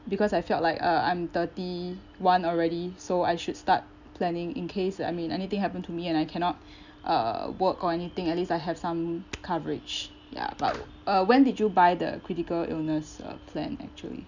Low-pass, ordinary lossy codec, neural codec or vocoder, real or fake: 7.2 kHz; none; none; real